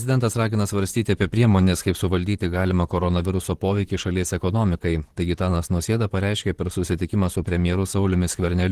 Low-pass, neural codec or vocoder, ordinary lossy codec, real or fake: 14.4 kHz; vocoder, 44.1 kHz, 128 mel bands, Pupu-Vocoder; Opus, 16 kbps; fake